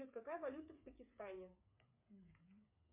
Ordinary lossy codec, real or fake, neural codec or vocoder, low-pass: MP3, 32 kbps; fake; codec, 16 kHz, 8 kbps, FreqCodec, smaller model; 3.6 kHz